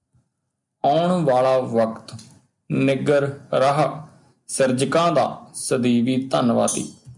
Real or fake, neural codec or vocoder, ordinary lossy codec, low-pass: real; none; MP3, 96 kbps; 10.8 kHz